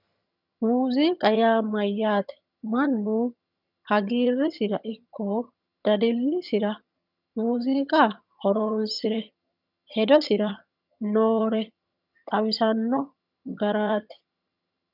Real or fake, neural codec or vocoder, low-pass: fake; vocoder, 22.05 kHz, 80 mel bands, HiFi-GAN; 5.4 kHz